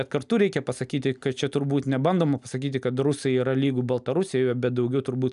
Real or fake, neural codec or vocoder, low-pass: real; none; 10.8 kHz